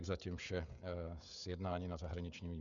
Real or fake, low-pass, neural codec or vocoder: fake; 7.2 kHz; codec, 16 kHz, 16 kbps, FreqCodec, smaller model